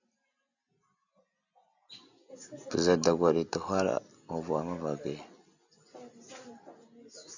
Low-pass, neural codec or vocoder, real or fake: 7.2 kHz; none; real